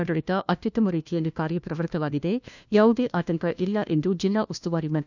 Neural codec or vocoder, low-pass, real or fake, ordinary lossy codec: codec, 16 kHz, 1 kbps, FunCodec, trained on LibriTTS, 50 frames a second; 7.2 kHz; fake; none